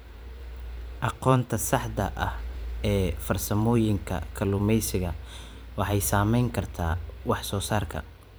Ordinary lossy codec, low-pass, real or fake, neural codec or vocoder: none; none; fake; vocoder, 44.1 kHz, 128 mel bands every 512 samples, BigVGAN v2